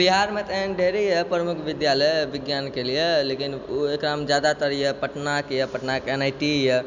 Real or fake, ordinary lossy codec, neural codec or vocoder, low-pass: real; none; none; 7.2 kHz